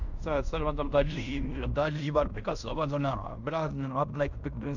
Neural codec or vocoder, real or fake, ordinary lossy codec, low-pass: codec, 16 kHz in and 24 kHz out, 0.9 kbps, LongCat-Audio-Codec, fine tuned four codebook decoder; fake; none; 7.2 kHz